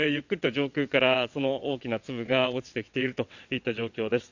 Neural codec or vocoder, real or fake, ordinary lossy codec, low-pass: vocoder, 22.05 kHz, 80 mel bands, WaveNeXt; fake; none; 7.2 kHz